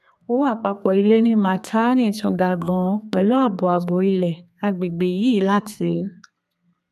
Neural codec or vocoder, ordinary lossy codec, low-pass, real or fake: codec, 32 kHz, 1.9 kbps, SNAC; none; 14.4 kHz; fake